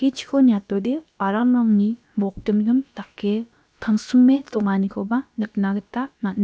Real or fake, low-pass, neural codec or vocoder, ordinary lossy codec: fake; none; codec, 16 kHz, about 1 kbps, DyCAST, with the encoder's durations; none